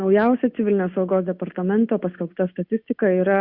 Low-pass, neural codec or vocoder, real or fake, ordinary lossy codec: 5.4 kHz; none; real; Opus, 64 kbps